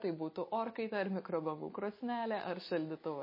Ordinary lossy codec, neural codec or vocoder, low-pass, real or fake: MP3, 24 kbps; none; 5.4 kHz; real